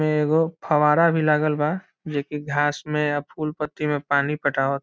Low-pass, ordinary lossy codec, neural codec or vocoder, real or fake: none; none; none; real